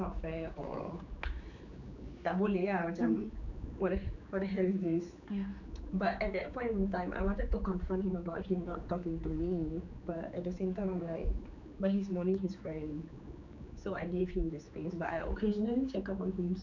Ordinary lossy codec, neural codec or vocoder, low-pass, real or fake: none; codec, 16 kHz, 4 kbps, X-Codec, HuBERT features, trained on general audio; 7.2 kHz; fake